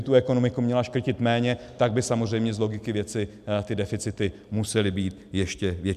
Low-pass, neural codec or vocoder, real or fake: 14.4 kHz; none; real